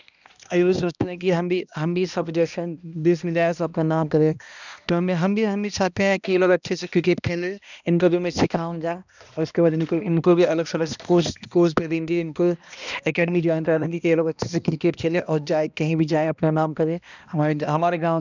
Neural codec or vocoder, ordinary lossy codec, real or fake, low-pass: codec, 16 kHz, 1 kbps, X-Codec, HuBERT features, trained on balanced general audio; none; fake; 7.2 kHz